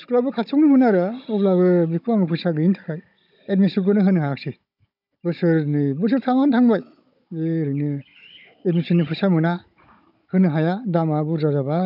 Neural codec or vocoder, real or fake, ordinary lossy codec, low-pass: codec, 16 kHz, 16 kbps, FunCodec, trained on Chinese and English, 50 frames a second; fake; none; 5.4 kHz